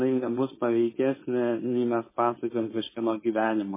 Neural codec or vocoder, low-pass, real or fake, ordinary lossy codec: codec, 16 kHz, 4.8 kbps, FACodec; 3.6 kHz; fake; MP3, 16 kbps